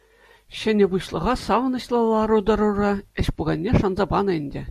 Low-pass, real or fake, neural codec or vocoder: 14.4 kHz; real; none